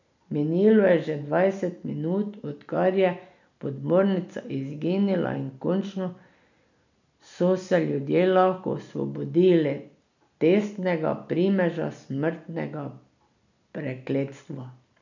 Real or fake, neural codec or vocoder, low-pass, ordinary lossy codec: real; none; 7.2 kHz; none